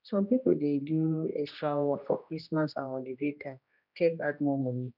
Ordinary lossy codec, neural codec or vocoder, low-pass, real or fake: none; codec, 16 kHz, 1 kbps, X-Codec, HuBERT features, trained on general audio; 5.4 kHz; fake